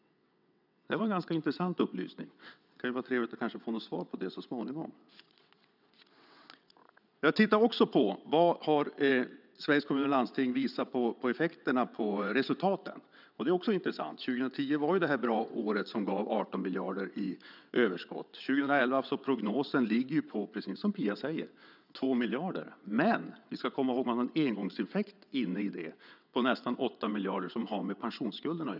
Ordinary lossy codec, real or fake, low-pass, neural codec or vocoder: none; fake; 5.4 kHz; vocoder, 22.05 kHz, 80 mel bands, WaveNeXt